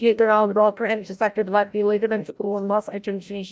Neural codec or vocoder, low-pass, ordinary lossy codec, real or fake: codec, 16 kHz, 0.5 kbps, FreqCodec, larger model; none; none; fake